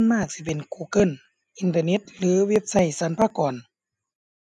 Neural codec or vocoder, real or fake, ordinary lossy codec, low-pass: none; real; none; none